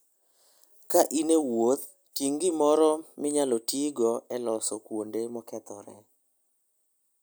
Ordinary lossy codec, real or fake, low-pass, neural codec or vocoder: none; real; none; none